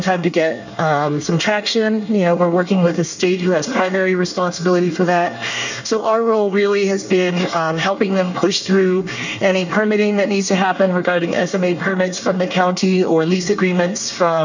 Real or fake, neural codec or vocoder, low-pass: fake; codec, 24 kHz, 1 kbps, SNAC; 7.2 kHz